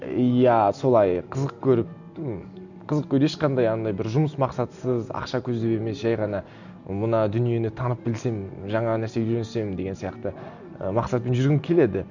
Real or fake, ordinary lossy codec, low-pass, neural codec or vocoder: real; none; 7.2 kHz; none